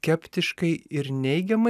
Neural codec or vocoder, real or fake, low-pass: none; real; 14.4 kHz